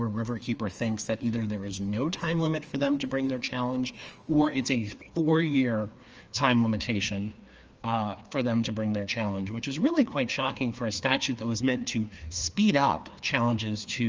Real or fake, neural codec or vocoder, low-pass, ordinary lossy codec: fake; codec, 16 kHz, 2 kbps, FreqCodec, larger model; 7.2 kHz; Opus, 24 kbps